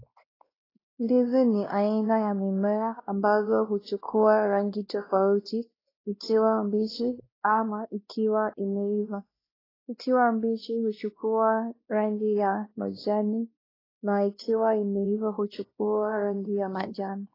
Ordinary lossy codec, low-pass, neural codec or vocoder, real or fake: AAC, 24 kbps; 5.4 kHz; codec, 16 kHz, 1 kbps, X-Codec, WavLM features, trained on Multilingual LibriSpeech; fake